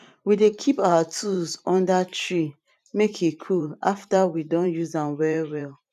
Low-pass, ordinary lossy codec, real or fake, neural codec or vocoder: 14.4 kHz; none; fake; vocoder, 44.1 kHz, 128 mel bands every 512 samples, BigVGAN v2